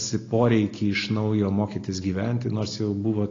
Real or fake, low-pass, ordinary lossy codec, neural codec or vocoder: real; 7.2 kHz; AAC, 32 kbps; none